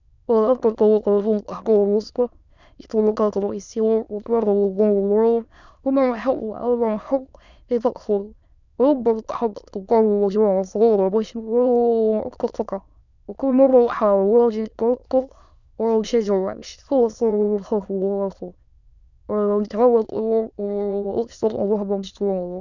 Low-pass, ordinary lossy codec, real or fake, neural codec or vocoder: 7.2 kHz; none; fake; autoencoder, 22.05 kHz, a latent of 192 numbers a frame, VITS, trained on many speakers